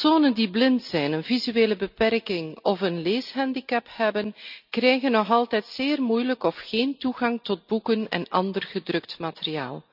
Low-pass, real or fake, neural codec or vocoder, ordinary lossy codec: 5.4 kHz; real; none; none